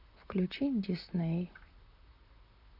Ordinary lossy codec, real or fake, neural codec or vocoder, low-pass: none; fake; vocoder, 44.1 kHz, 128 mel bands, Pupu-Vocoder; 5.4 kHz